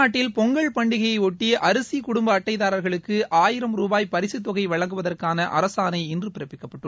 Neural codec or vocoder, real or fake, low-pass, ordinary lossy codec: none; real; none; none